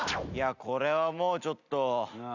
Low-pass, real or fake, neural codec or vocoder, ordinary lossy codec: 7.2 kHz; real; none; none